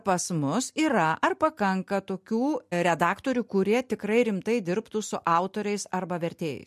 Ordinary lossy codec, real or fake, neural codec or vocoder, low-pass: MP3, 64 kbps; real; none; 14.4 kHz